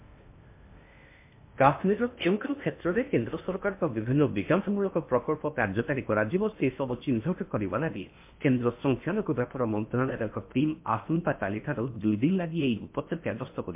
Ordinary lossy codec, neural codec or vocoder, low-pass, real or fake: MP3, 32 kbps; codec, 16 kHz in and 24 kHz out, 0.6 kbps, FocalCodec, streaming, 4096 codes; 3.6 kHz; fake